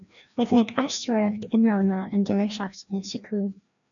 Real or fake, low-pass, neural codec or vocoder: fake; 7.2 kHz; codec, 16 kHz, 1 kbps, FreqCodec, larger model